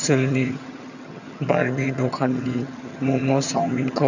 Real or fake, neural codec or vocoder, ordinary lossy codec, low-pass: fake; vocoder, 22.05 kHz, 80 mel bands, HiFi-GAN; none; 7.2 kHz